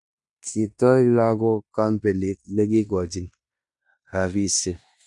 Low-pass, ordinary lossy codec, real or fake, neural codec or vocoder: 10.8 kHz; none; fake; codec, 16 kHz in and 24 kHz out, 0.9 kbps, LongCat-Audio-Codec, fine tuned four codebook decoder